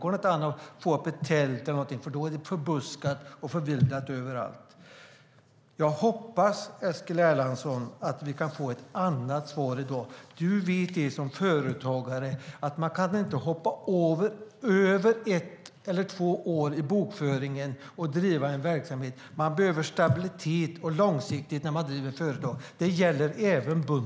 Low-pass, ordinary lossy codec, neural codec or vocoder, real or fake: none; none; none; real